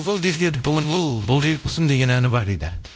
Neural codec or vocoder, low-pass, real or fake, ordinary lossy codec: codec, 16 kHz, 0.5 kbps, X-Codec, WavLM features, trained on Multilingual LibriSpeech; none; fake; none